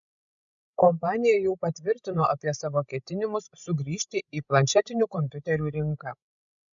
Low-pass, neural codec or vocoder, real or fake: 7.2 kHz; codec, 16 kHz, 16 kbps, FreqCodec, larger model; fake